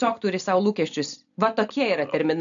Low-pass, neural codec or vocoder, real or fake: 7.2 kHz; none; real